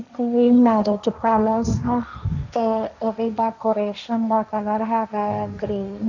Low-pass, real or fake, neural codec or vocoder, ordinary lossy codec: 7.2 kHz; fake; codec, 16 kHz, 1.1 kbps, Voila-Tokenizer; none